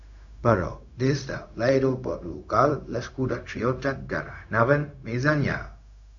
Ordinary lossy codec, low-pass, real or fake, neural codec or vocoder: AAC, 64 kbps; 7.2 kHz; fake; codec, 16 kHz, 0.4 kbps, LongCat-Audio-Codec